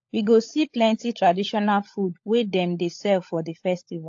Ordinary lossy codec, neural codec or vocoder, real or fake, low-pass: AAC, 48 kbps; codec, 16 kHz, 16 kbps, FunCodec, trained on LibriTTS, 50 frames a second; fake; 7.2 kHz